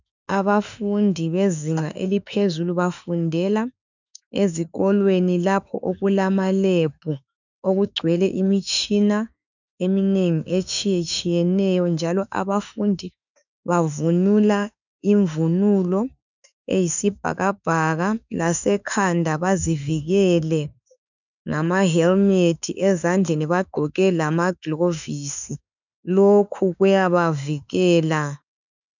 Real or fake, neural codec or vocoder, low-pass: fake; autoencoder, 48 kHz, 32 numbers a frame, DAC-VAE, trained on Japanese speech; 7.2 kHz